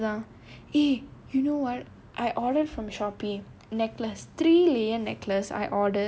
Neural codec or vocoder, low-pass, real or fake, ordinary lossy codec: none; none; real; none